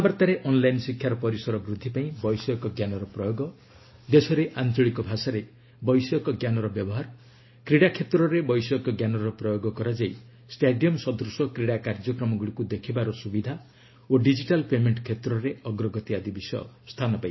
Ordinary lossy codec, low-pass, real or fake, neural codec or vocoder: MP3, 24 kbps; 7.2 kHz; real; none